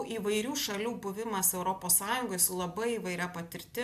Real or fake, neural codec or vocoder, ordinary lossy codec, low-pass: real; none; AAC, 96 kbps; 14.4 kHz